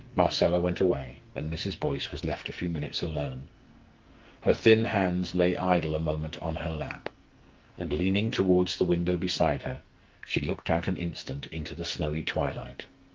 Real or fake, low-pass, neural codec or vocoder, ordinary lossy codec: fake; 7.2 kHz; codec, 44.1 kHz, 2.6 kbps, SNAC; Opus, 24 kbps